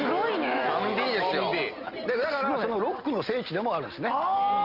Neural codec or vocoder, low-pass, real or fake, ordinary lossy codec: none; 5.4 kHz; real; Opus, 24 kbps